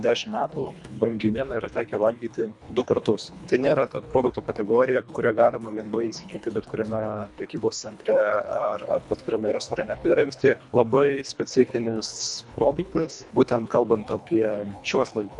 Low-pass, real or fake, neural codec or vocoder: 10.8 kHz; fake; codec, 24 kHz, 1.5 kbps, HILCodec